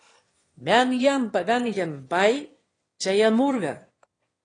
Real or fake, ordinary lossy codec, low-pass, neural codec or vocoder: fake; AAC, 32 kbps; 9.9 kHz; autoencoder, 22.05 kHz, a latent of 192 numbers a frame, VITS, trained on one speaker